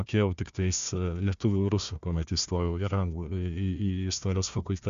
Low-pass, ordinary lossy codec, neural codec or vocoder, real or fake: 7.2 kHz; MP3, 64 kbps; codec, 16 kHz, 1 kbps, FunCodec, trained on Chinese and English, 50 frames a second; fake